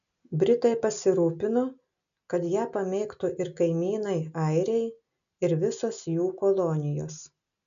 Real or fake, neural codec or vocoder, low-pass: real; none; 7.2 kHz